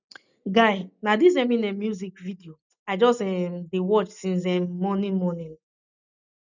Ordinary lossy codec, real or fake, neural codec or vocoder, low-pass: none; real; none; 7.2 kHz